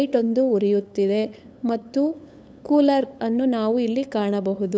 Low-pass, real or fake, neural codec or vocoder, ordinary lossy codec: none; fake; codec, 16 kHz, 8 kbps, FunCodec, trained on LibriTTS, 25 frames a second; none